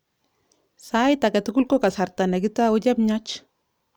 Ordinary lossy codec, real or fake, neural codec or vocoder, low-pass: none; real; none; none